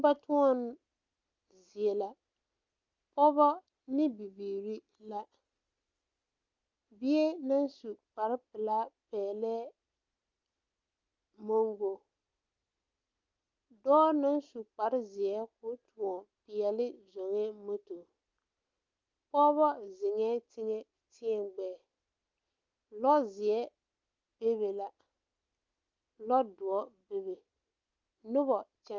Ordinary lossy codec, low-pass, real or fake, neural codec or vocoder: Opus, 32 kbps; 7.2 kHz; real; none